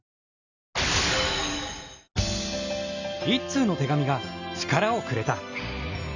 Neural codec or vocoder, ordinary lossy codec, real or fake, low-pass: none; none; real; 7.2 kHz